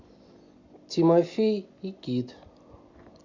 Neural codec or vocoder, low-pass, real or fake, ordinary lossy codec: none; 7.2 kHz; real; Opus, 64 kbps